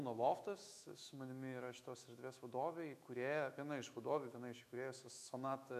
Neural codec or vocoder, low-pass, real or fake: autoencoder, 48 kHz, 128 numbers a frame, DAC-VAE, trained on Japanese speech; 14.4 kHz; fake